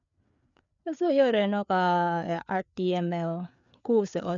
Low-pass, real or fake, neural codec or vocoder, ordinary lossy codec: 7.2 kHz; fake; codec, 16 kHz, 4 kbps, FreqCodec, larger model; none